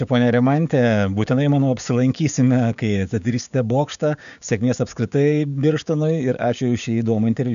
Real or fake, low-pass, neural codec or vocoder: fake; 7.2 kHz; codec, 16 kHz, 6 kbps, DAC